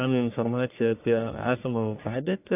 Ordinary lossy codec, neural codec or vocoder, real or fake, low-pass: AAC, 32 kbps; codec, 44.1 kHz, 1.7 kbps, Pupu-Codec; fake; 3.6 kHz